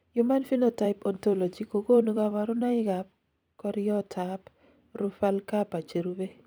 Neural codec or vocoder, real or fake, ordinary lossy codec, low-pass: vocoder, 44.1 kHz, 128 mel bands every 256 samples, BigVGAN v2; fake; none; none